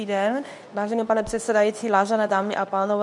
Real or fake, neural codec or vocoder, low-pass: fake; codec, 24 kHz, 0.9 kbps, WavTokenizer, medium speech release version 2; 10.8 kHz